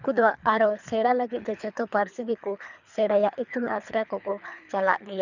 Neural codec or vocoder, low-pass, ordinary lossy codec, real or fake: codec, 24 kHz, 3 kbps, HILCodec; 7.2 kHz; none; fake